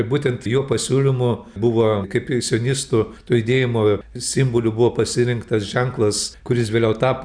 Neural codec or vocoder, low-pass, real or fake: none; 9.9 kHz; real